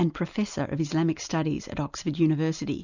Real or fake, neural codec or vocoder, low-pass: real; none; 7.2 kHz